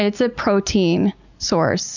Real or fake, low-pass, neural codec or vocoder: real; 7.2 kHz; none